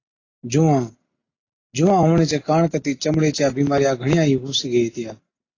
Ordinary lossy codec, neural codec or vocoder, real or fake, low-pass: AAC, 32 kbps; none; real; 7.2 kHz